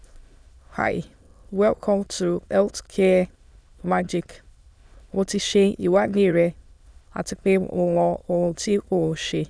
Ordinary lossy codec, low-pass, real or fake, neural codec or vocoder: none; none; fake; autoencoder, 22.05 kHz, a latent of 192 numbers a frame, VITS, trained on many speakers